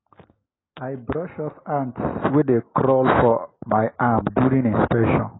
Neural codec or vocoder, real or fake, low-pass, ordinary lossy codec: none; real; 7.2 kHz; AAC, 16 kbps